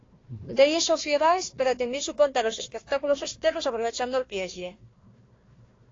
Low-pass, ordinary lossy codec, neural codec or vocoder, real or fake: 7.2 kHz; AAC, 32 kbps; codec, 16 kHz, 1 kbps, FunCodec, trained on Chinese and English, 50 frames a second; fake